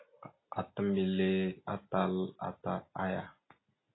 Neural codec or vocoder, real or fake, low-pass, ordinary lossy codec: none; real; 7.2 kHz; AAC, 16 kbps